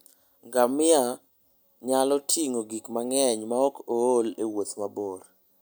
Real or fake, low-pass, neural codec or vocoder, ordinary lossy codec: real; none; none; none